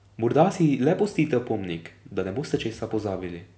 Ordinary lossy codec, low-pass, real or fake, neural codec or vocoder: none; none; real; none